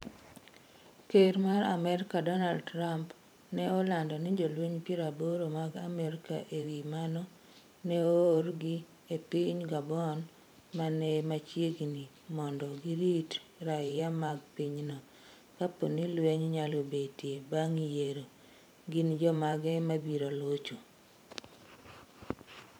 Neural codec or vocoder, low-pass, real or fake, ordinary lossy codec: vocoder, 44.1 kHz, 128 mel bands every 512 samples, BigVGAN v2; none; fake; none